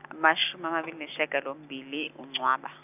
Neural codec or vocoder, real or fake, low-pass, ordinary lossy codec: none; real; 3.6 kHz; none